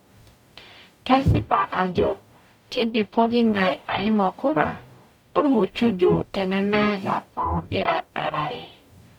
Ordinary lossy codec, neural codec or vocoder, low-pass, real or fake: none; codec, 44.1 kHz, 0.9 kbps, DAC; 19.8 kHz; fake